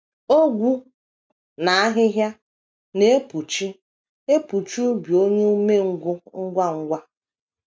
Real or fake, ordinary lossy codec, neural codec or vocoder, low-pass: real; none; none; none